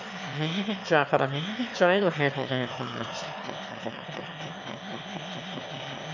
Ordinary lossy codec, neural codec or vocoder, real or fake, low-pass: none; autoencoder, 22.05 kHz, a latent of 192 numbers a frame, VITS, trained on one speaker; fake; 7.2 kHz